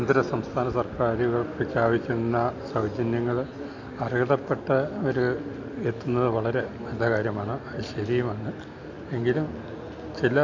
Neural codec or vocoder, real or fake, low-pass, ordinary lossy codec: none; real; 7.2 kHz; MP3, 64 kbps